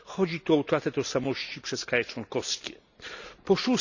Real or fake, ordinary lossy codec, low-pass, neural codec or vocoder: real; none; 7.2 kHz; none